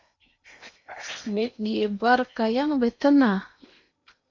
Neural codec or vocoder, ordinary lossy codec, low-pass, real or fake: codec, 16 kHz in and 24 kHz out, 0.8 kbps, FocalCodec, streaming, 65536 codes; MP3, 48 kbps; 7.2 kHz; fake